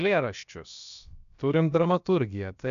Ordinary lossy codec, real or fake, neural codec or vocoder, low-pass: MP3, 96 kbps; fake; codec, 16 kHz, about 1 kbps, DyCAST, with the encoder's durations; 7.2 kHz